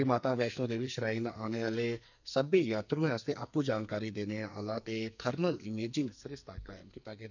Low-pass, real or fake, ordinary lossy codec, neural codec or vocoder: 7.2 kHz; fake; none; codec, 44.1 kHz, 2.6 kbps, SNAC